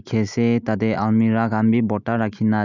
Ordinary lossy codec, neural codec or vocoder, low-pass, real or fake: none; none; 7.2 kHz; real